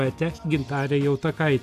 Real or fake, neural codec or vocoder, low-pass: real; none; 14.4 kHz